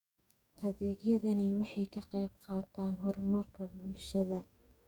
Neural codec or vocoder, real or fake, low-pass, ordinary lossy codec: codec, 44.1 kHz, 2.6 kbps, DAC; fake; 19.8 kHz; none